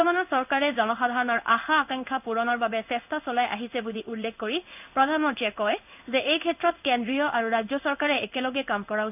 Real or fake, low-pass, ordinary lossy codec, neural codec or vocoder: fake; 3.6 kHz; none; codec, 16 kHz in and 24 kHz out, 1 kbps, XY-Tokenizer